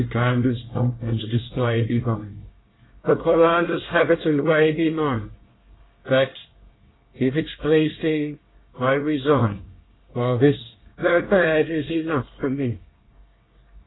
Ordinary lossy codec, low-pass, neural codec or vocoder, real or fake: AAC, 16 kbps; 7.2 kHz; codec, 24 kHz, 1 kbps, SNAC; fake